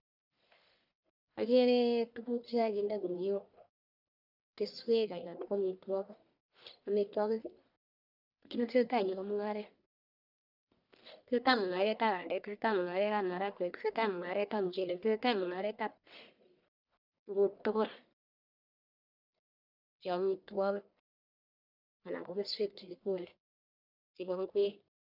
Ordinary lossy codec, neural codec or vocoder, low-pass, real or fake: none; codec, 44.1 kHz, 1.7 kbps, Pupu-Codec; 5.4 kHz; fake